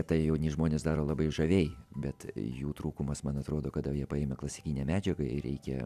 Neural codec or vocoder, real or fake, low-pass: none; real; 14.4 kHz